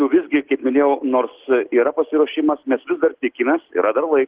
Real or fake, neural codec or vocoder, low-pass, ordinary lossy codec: real; none; 3.6 kHz; Opus, 16 kbps